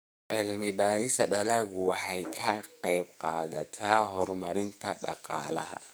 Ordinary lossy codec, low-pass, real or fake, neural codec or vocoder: none; none; fake; codec, 44.1 kHz, 2.6 kbps, SNAC